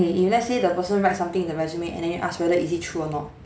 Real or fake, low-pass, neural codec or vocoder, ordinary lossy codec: real; none; none; none